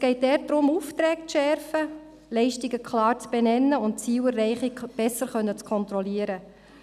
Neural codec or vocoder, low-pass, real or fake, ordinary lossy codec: none; 14.4 kHz; real; none